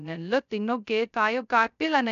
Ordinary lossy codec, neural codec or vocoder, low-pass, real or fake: none; codec, 16 kHz, 0.2 kbps, FocalCodec; 7.2 kHz; fake